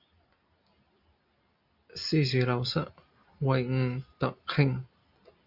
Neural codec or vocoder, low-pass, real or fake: none; 5.4 kHz; real